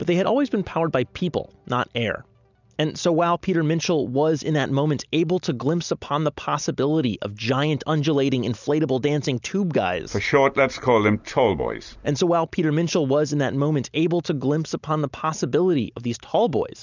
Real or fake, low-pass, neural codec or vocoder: real; 7.2 kHz; none